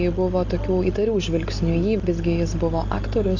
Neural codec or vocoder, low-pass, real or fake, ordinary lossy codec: none; 7.2 kHz; real; AAC, 48 kbps